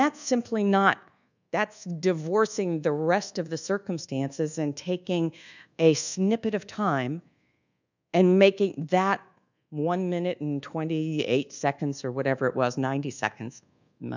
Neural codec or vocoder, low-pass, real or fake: codec, 24 kHz, 1.2 kbps, DualCodec; 7.2 kHz; fake